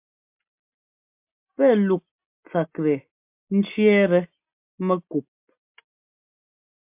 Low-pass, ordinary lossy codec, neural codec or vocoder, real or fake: 3.6 kHz; MP3, 32 kbps; none; real